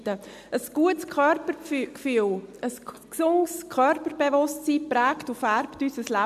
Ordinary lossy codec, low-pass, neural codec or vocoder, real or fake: MP3, 96 kbps; 14.4 kHz; none; real